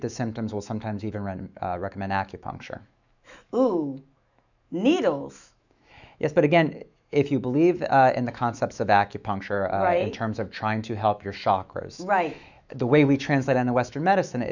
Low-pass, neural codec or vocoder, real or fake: 7.2 kHz; autoencoder, 48 kHz, 128 numbers a frame, DAC-VAE, trained on Japanese speech; fake